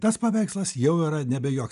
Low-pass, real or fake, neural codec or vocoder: 10.8 kHz; real; none